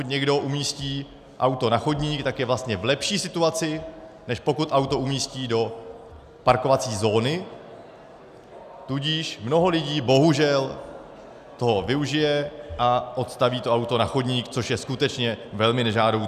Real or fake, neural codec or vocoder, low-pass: real; none; 14.4 kHz